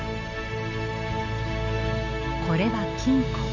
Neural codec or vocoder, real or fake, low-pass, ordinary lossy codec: none; real; 7.2 kHz; none